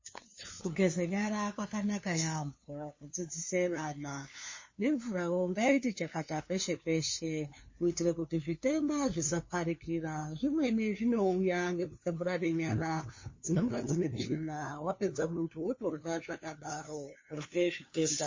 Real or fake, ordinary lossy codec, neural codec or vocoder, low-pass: fake; MP3, 32 kbps; codec, 16 kHz, 2 kbps, FunCodec, trained on LibriTTS, 25 frames a second; 7.2 kHz